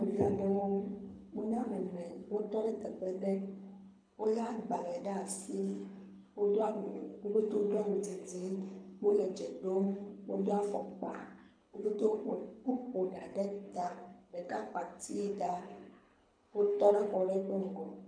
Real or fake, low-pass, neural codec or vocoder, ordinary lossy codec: fake; 9.9 kHz; codec, 24 kHz, 6 kbps, HILCodec; MP3, 64 kbps